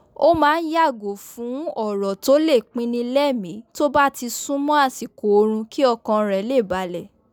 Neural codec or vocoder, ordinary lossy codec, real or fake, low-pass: none; none; real; none